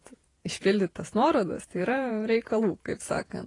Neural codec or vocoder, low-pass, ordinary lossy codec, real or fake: vocoder, 48 kHz, 128 mel bands, Vocos; 10.8 kHz; AAC, 32 kbps; fake